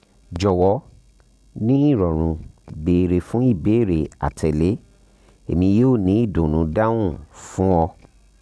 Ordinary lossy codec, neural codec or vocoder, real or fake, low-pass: none; none; real; none